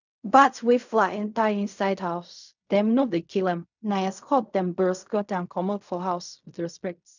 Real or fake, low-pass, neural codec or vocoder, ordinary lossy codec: fake; 7.2 kHz; codec, 16 kHz in and 24 kHz out, 0.4 kbps, LongCat-Audio-Codec, fine tuned four codebook decoder; none